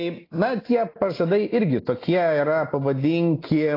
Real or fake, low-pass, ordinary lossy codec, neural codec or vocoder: real; 5.4 kHz; AAC, 24 kbps; none